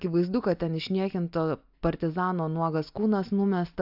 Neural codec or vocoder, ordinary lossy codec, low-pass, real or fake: none; AAC, 48 kbps; 5.4 kHz; real